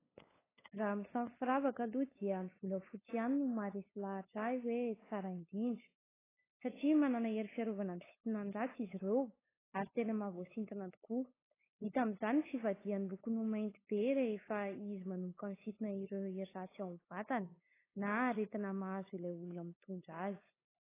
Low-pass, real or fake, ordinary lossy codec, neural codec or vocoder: 3.6 kHz; fake; AAC, 16 kbps; codec, 16 kHz, 8 kbps, FunCodec, trained on LibriTTS, 25 frames a second